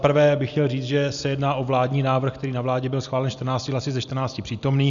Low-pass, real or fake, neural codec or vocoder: 7.2 kHz; real; none